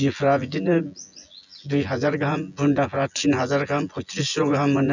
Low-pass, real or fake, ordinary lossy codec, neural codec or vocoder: 7.2 kHz; fake; none; vocoder, 24 kHz, 100 mel bands, Vocos